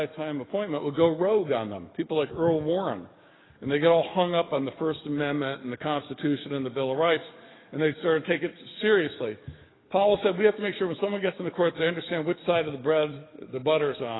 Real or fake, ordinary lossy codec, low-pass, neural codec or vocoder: fake; AAC, 16 kbps; 7.2 kHz; codec, 44.1 kHz, 7.8 kbps, DAC